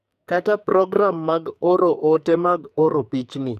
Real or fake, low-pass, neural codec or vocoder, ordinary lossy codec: fake; 14.4 kHz; codec, 44.1 kHz, 2.6 kbps, SNAC; none